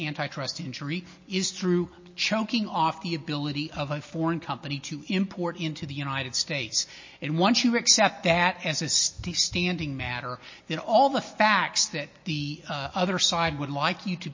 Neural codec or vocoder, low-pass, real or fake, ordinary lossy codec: none; 7.2 kHz; real; MP3, 32 kbps